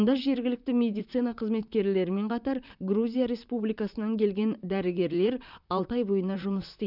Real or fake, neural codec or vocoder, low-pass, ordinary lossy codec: fake; vocoder, 44.1 kHz, 128 mel bands, Pupu-Vocoder; 5.4 kHz; none